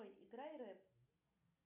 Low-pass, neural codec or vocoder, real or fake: 3.6 kHz; none; real